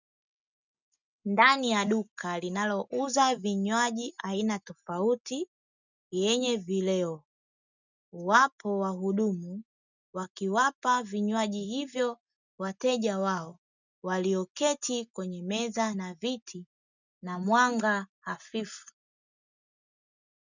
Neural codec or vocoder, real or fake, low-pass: none; real; 7.2 kHz